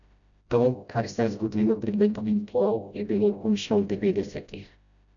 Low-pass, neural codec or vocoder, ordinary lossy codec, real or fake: 7.2 kHz; codec, 16 kHz, 0.5 kbps, FreqCodec, smaller model; MP3, 96 kbps; fake